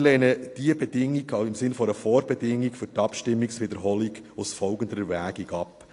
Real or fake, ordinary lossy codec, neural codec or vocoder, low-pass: real; AAC, 48 kbps; none; 10.8 kHz